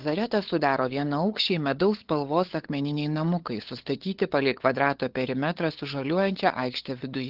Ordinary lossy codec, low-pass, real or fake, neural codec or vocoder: Opus, 16 kbps; 5.4 kHz; fake; codec, 16 kHz, 8 kbps, FunCodec, trained on LibriTTS, 25 frames a second